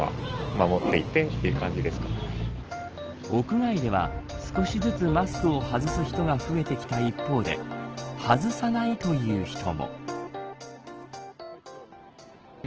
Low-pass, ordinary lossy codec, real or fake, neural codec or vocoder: 7.2 kHz; Opus, 16 kbps; real; none